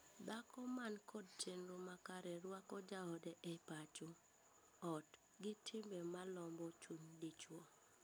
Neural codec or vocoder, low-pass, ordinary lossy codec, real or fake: none; none; none; real